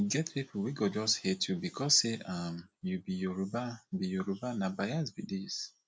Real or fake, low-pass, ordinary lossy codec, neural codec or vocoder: real; none; none; none